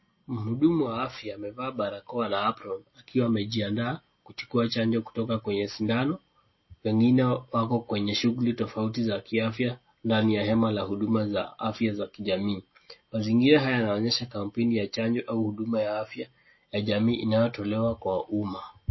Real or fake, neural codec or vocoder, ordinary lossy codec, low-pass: real; none; MP3, 24 kbps; 7.2 kHz